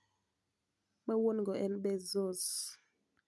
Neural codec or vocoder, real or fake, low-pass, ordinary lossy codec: none; real; none; none